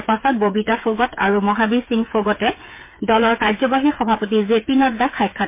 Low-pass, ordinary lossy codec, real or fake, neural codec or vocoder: 3.6 kHz; MP3, 24 kbps; fake; codec, 16 kHz, 8 kbps, FreqCodec, smaller model